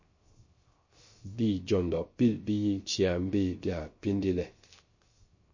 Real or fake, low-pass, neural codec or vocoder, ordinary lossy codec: fake; 7.2 kHz; codec, 16 kHz, 0.3 kbps, FocalCodec; MP3, 32 kbps